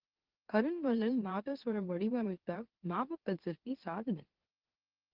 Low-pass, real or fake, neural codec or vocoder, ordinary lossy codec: 5.4 kHz; fake; autoencoder, 44.1 kHz, a latent of 192 numbers a frame, MeloTTS; Opus, 16 kbps